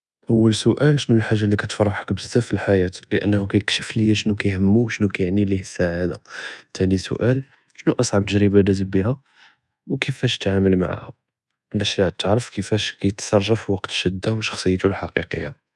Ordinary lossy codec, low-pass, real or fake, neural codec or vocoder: none; none; fake; codec, 24 kHz, 1.2 kbps, DualCodec